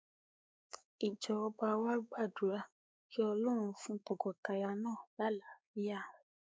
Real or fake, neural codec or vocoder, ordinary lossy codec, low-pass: fake; codec, 16 kHz, 4 kbps, X-Codec, WavLM features, trained on Multilingual LibriSpeech; none; none